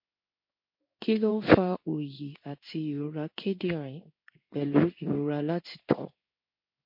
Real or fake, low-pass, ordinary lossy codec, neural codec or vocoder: fake; 5.4 kHz; MP3, 32 kbps; codec, 16 kHz in and 24 kHz out, 1 kbps, XY-Tokenizer